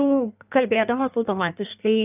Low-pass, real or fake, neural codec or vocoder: 3.6 kHz; fake; codec, 16 kHz in and 24 kHz out, 1.1 kbps, FireRedTTS-2 codec